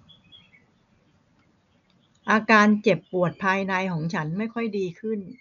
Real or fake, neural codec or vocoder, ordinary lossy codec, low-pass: real; none; none; 7.2 kHz